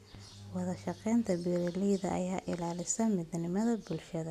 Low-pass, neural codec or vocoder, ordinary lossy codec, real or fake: 14.4 kHz; none; none; real